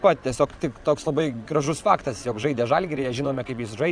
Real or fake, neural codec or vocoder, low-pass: fake; vocoder, 22.05 kHz, 80 mel bands, WaveNeXt; 9.9 kHz